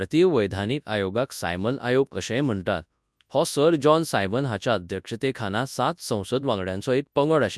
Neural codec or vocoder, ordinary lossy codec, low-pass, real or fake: codec, 24 kHz, 0.9 kbps, WavTokenizer, large speech release; none; none; fake